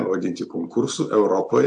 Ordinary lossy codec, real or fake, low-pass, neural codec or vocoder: AAC, 64 kbps; real; 10.8 kHz; none